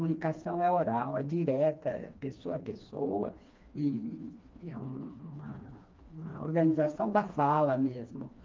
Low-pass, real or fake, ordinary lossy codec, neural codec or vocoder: 7.2 kHz; fake; Opus, 24 kbps; codec, 16 kHz, 2 kbps, FreqCodec, smaller model